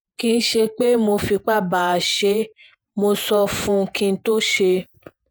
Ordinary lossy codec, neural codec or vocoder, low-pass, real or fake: none; vocoder, 48 kHz, 128 mel bands, Vocos; none; fake